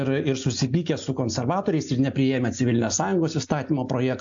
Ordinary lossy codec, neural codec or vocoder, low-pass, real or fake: AAC, 64 kbps; none; 7.2 kHz; real